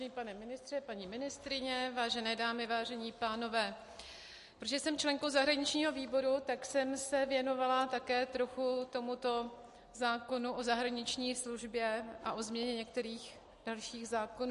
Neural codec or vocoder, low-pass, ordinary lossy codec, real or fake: none; 14.4 kHz; MP3, 48 kbps; real